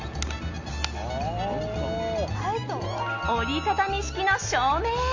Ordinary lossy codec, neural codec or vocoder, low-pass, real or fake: AAC, 48 kbps; none; 7.2 kHz; real